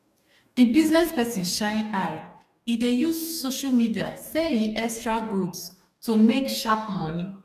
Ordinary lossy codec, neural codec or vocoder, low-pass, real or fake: none; codec, 44.1 kHz, 2.6 kbps, DAC; 14.4 kHz; fake